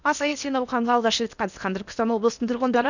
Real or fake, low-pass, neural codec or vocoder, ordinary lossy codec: fake; 7.2 kHz; codec, 16 kHz in and 24 kHz out, 0.8 kbps, FocalCodec, streaming, 65536 codes; none